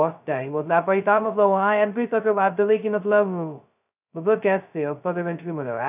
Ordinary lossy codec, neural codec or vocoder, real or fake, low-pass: none; codec, 16 kHz, 0.2 kbps, FocalCodec; fake; 3.6 kHz